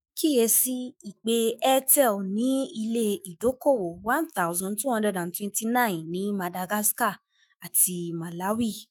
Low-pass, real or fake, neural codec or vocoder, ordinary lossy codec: none; fake; autoencoder, 48 kHz, 128 numbers a frame, DAC-VAE, trained on Japanese speech; none